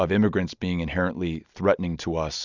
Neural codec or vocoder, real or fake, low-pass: none; real; 7.2 kHz